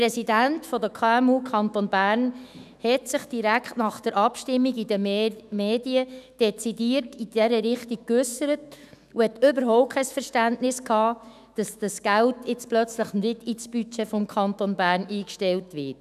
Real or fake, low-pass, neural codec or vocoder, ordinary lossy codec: fake; 14.4 kHz; autoencoder, 48 kHz, 128 numbers a frame, DAC-VAE, trained on Japanese speech; none